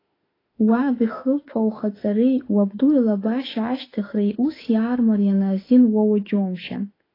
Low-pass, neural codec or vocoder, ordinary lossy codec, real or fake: 5.4 kHz; autoencoder, 48 kHz, 32 numbers a frame, DAC-VAE, trained on Japanese speech; AAC, 24 kbps; fake